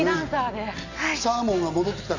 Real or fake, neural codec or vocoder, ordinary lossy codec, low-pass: real; none; none; 7.2 kHz